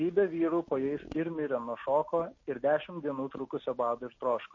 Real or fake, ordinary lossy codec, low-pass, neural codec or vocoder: real; MP3, 32 kbps; 7.2 kHz; none